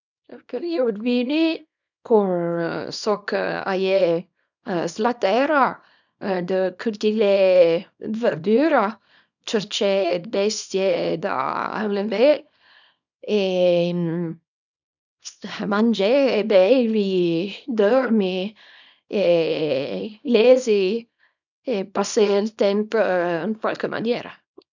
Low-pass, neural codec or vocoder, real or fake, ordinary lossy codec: 7.2 kHz; codec, 24 kHz, 0.9 kbps, WavTokenizer, small release; fake; none